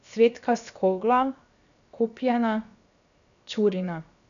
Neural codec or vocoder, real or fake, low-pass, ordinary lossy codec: codec, 16 kHz, 0.7 kbps, FocalCodec; fake; 7.2 kHz; none